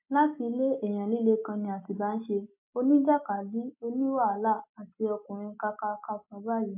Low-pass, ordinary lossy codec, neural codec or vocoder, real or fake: 3.6 kHz; MP3, 32 kbps; none; real